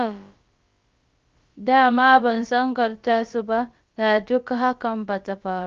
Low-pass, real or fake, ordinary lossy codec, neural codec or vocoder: 7.2 kHz; fake; Opus, 32 kbps; codec, 16 kHz, about 1 kbps, DyCAST, with the encoder's durations